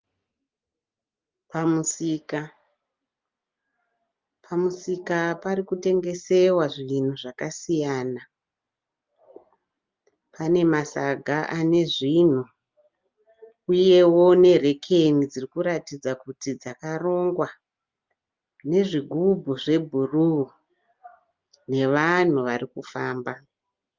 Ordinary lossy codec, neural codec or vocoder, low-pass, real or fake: Opus, 24 kbps; none; 7.2 kHz; real